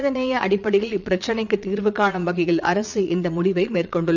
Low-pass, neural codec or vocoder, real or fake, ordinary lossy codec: 7.2 kHz; vocoder, 22.05 kHz, 80 mel bands, Vocos; fake; none